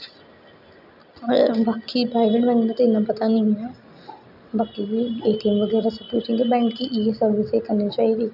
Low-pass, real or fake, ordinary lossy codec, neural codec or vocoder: 5.4 kHz; real; none; none